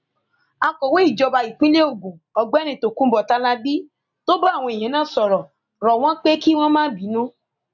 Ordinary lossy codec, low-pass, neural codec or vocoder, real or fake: none; 7.2 kHz; none; real